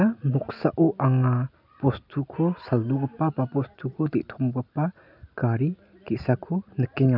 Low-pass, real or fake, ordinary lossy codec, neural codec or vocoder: 5.4 kHz; real; none; none